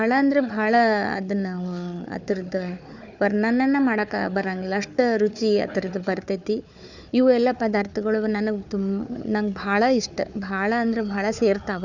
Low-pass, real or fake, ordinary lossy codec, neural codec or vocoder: 7.2 kHz; fake; none; codec, 16 kHz, 4 kbps, FunCodec, trained on Chinese and English, 50 frames a second